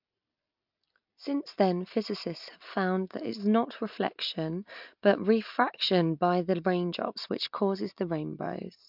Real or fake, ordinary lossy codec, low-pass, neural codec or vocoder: real; MP3, 48 kbps; 5.4 kHz; none